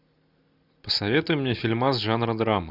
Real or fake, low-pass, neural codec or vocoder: real; 5.4 kHz; none